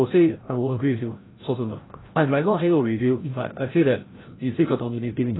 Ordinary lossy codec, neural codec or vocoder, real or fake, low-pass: AAC, 16 kbps; codec, 16 kHz, 0.5 kbps, FreqCodec, larger model; fake; 7.2 kHz